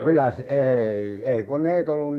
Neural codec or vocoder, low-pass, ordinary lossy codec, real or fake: codec, 32 kHz, 1.9 kbps, SNAC; 14.4 kHz; none; fake